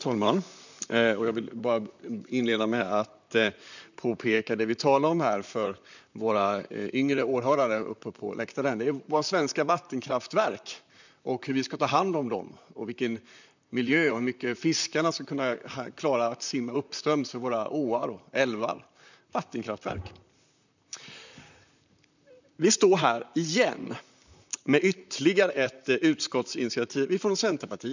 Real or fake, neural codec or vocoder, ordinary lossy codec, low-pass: fake; vocoder, 44.1 kHz, 128 mel bands, Pupu-Vocoder; none; 7.2 kHz